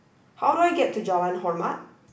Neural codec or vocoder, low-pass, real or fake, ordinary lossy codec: none; none; real; none